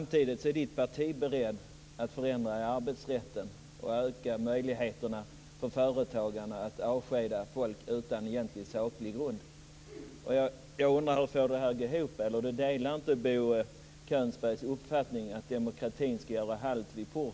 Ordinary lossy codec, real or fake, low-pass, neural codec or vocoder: none; real; none; none